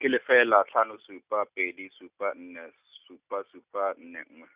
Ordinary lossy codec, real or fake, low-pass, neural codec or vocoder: Opus, 64 kbps; real; 3.6 kHz; none